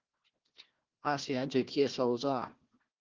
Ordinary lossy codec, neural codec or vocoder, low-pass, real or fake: Opus, 16 kbps; codec, 16 kHz, 1 kbps, FunCodec, trained on Chinese and English, 50 frames a second; 7.2 kHz; fake